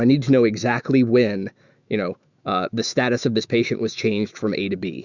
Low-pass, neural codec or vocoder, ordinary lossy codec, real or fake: 7.2 kHz; autoencoder, 48 kHz, 128 numbers a frame, DAC-VAE, trained on Japanese speech; Opus, 64 kbps; fake